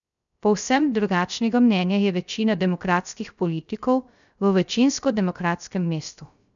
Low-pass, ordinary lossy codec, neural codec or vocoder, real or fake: 7.2 kHz; none; codec, 16 kHz, 0.3 kbps, FocalCodec; fake